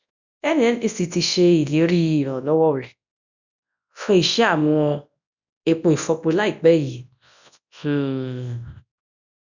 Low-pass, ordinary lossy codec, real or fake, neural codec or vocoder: 7.2 kHz; none; fake; codec, 24 kHz, 0.9 kbps, WavTokenizer, large speech release